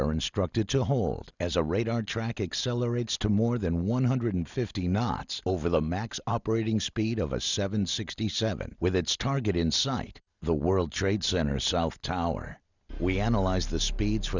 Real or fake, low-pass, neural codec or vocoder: real; 7.2 kHz; none